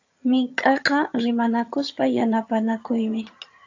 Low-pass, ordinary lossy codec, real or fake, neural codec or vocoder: 7.2 kHz; AAC, 48 kbps; fake; vocoder, 22.05 kHz, 80 mel bands, HiFi-GAN